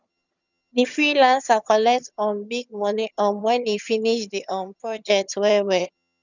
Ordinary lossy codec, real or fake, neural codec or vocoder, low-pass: none; fake; vocoder, 22.05 kHz, 80 mel bands, HiFi-GAN; 7.2 kHz